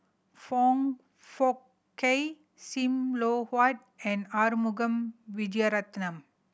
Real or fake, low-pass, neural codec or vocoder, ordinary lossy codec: real; none; none; none